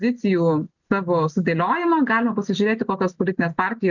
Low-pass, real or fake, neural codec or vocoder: 7.2 kHz; real; none